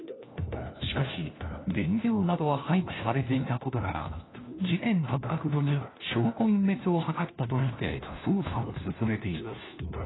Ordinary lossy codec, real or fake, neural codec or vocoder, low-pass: AAC, 16 kbps; fake; codec, 16 kHz, 1 kbps, FunCodec, trained on LibriTTS, 50 frames a second; 7.2 kHz